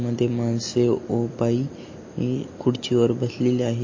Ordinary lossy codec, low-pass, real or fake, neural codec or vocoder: MP3, 32 kbps; 7.2 kHz; real; none